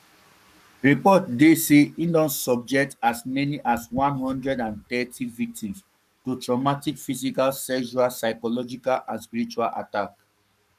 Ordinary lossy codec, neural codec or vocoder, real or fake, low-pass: none; codec, 44.1 kHz, 7.8 kbps, Pupu-Codec; fake; 14.4 kHz